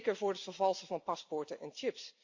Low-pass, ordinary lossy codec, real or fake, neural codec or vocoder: 7.2 kHz; AAC, 48 kbps; real; none